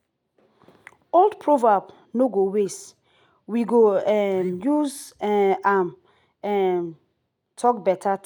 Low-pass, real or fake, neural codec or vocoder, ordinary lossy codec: 19.8 kHz; real; none; none